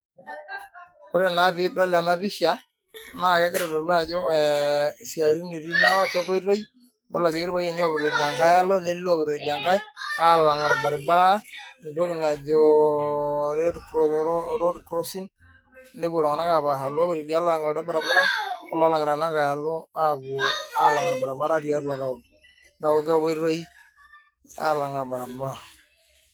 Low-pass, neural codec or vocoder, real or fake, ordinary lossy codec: none; codec, 44.1 kHz, 2.6 kbps, SNAC; fake; none